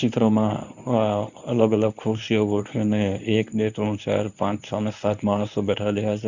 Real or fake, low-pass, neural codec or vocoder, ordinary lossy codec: fake; 7.2 kHz; codec, 24 kHz, 0.9 kbps, WavTokenizer, medium speech release version 1; none